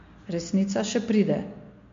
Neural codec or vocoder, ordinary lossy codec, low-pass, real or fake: none; MP3, 48 kbps; 7.2 kHz; real